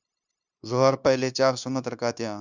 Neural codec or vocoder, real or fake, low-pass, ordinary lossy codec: codec, 16 kHz, 0.9 kbps, LongCat-Audio-Codec; fake; 7.2 kHz; Opus, 64 kbps